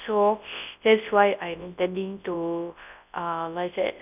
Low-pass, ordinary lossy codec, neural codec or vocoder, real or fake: 3.6 kHz; none; codec, 24 kHz, 0.9 kbps, WavTokenizer, large speech release; fake